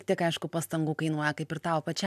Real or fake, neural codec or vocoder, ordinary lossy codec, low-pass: real; none; MP3, 96 kbps; 14.4 kHz